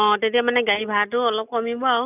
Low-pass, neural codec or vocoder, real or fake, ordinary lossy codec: 3.6 kHz; none; real; none